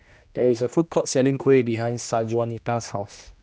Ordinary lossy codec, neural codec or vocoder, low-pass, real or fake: none; codec, 16 kHz, 1 kbps, X-Codec, HuBERT features, trained on general audio; none; fake